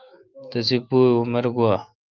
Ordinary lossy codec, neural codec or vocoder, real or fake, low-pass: Opus, 32 kbps; autoencoder, 48 kHz, 128 numbers a frame, DAC-VAE, trained on Japanese speech; fake; 7.2 kHz